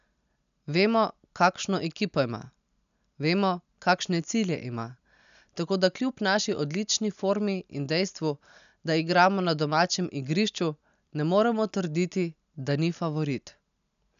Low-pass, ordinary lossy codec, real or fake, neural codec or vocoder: 7.2 kHz; none; real; none